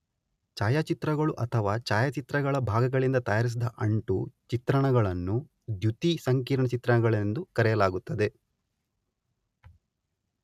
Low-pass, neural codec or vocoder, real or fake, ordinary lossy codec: 14.4 kHz; none; real; none